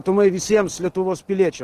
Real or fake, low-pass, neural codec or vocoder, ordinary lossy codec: real; 14.4 kHz; none; Opus, 16 kbps